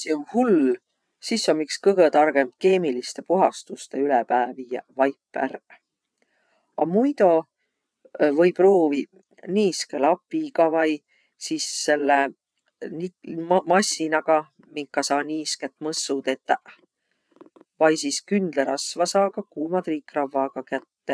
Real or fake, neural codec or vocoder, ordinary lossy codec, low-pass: fake; vocoder, 22.05 kHz, 80 mel bands, WaveNeXt; none; none